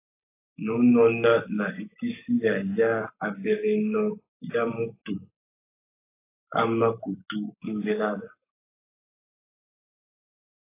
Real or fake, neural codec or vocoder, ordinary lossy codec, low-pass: fake; codec, 44.1 kHz, 7.8 kbps, Pupu-Codec; AAC, 24 kbps; 3.6 kHz